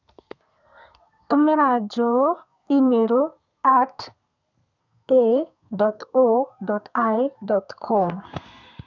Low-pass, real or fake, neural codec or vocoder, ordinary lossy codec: 7.2 kHz; fake; codec, 32 kHz, 1.9 kbps, SNAC; none